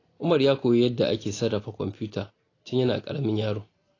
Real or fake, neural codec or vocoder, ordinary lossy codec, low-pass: real; none; AAC, 32 kbps; 7.2 kHz